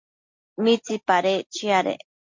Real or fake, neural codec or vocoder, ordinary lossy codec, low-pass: real; none; MP3, 48 kbps; 7.2 kHz